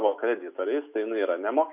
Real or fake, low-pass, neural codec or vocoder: real; 3.6 kHz; none